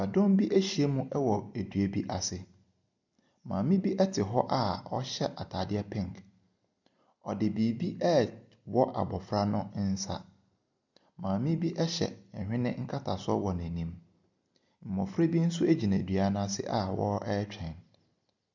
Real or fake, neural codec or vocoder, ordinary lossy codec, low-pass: real; none; MP3, 64 kbps; 7.2 kHz